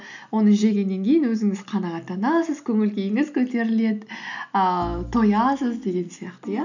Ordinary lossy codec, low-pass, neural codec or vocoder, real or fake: none; 7.2 kHz; none; real